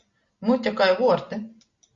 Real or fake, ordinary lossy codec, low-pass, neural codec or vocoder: real; Opus, 64 kbps; 7.2 kHz; none